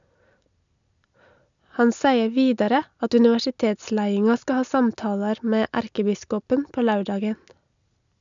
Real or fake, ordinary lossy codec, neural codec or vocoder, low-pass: real; none; none; 7.2 kHz